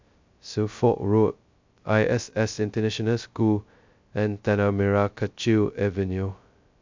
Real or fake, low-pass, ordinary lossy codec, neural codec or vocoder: fake; 7.2 kHz; MP3, 64 kbps; codec, 16 kHz, 0.2 kbps, FocalCodec